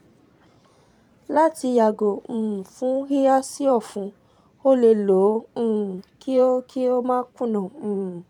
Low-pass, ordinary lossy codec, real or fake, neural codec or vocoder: 19.8 kHz; none; fake; vocoder, 44.1 kHz, 128 mel bands every 512 samples, BigVGAN v2